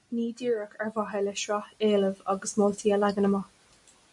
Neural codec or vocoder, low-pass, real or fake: none; 10.8 kHz; real